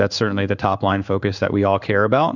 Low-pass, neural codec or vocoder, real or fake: 7.2 kHz; codec, 16 kHz in and 24 kHz out, 1 kbps, XY-Tokenizer; fake